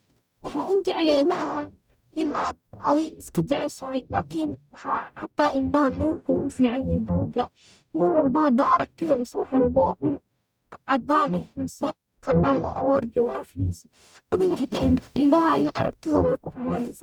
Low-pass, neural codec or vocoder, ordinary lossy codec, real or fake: 19.8 kHz; codec, 44.1 kHz, 0.9 kbps, DAC; none; fake